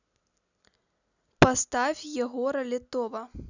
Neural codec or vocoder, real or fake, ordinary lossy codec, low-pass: none; real; none; 7.2 kHz